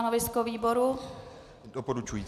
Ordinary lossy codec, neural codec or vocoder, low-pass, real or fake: AAC, 96 kbps; none; 14.4 kHz; real